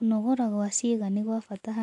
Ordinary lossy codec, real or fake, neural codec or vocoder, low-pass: MP3, 64 kbps; real; none; 10.8 kHz